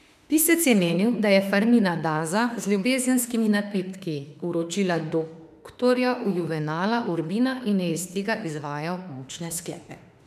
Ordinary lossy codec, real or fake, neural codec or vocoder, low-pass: none; fake; autoencoder, 48 kHz, 32 numbers a frame, DAC-VAE, trained on Japanese speech; 14.4 kHz